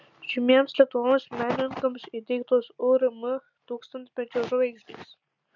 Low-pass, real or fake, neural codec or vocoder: 7.2 kHz; real; none